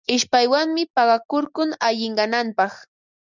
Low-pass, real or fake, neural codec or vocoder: 7.2 kHz; real; none